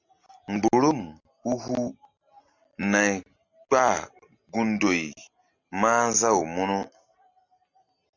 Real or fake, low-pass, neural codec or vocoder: real; 7.2 kHz; none